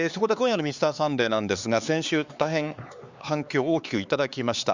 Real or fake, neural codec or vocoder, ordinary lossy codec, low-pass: fake; codec, 16 kHz, 4 kbps, X-Codec, HuBERT features, trained on LibriSpeech; Opus, 64 kbps; 7.2 kHz